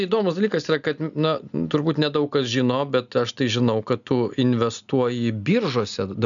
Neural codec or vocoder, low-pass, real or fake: none; 7.2 kHz; real